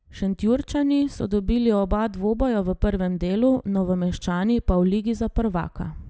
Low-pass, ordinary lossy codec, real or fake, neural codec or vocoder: none; none; real; none